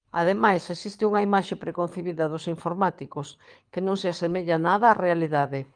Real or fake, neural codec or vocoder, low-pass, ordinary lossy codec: fake; codec, 24 kHz, 6 kbps, HILCodec; 9.9 kHz; Opus, 32 kbps